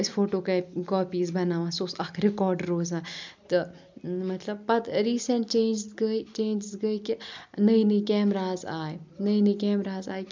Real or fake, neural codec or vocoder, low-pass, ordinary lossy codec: real; none; 7.2 kHz; MP3, 64 kbps